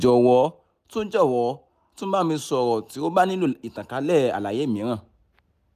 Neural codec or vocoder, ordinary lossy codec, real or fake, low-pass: none; none; real; 14.4 kHz